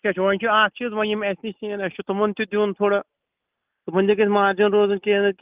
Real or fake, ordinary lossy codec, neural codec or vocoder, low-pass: real; Opus, 24 kbps; none; 3.6 kHz